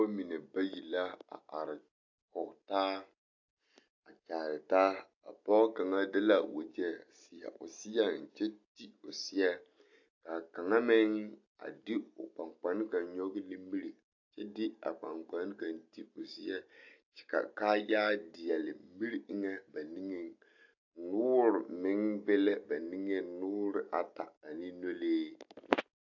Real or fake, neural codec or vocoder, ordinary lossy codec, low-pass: real; none; AAC, 48 kbps; 7.2 kHz